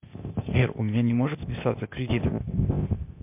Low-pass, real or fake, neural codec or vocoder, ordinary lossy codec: 3.6 kHz; fake; codec, 16 kHz, 0.8 kbps, ZipCodec; MP3, 32 kbps